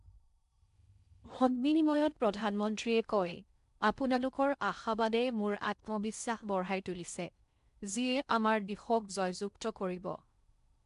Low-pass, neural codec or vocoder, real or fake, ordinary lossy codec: 10.8 kHz; codec, 16 kHz in and 24 kHz out, 0.6 kbps, FocalCodec, streaming, 4096 codes; fake; MP3, 96 kbps